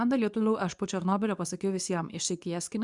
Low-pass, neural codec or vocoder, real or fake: 10.8 kHz; codec, 24 kHz, 0.9 kbps, WavTokenizer, medium speech release version 2; fake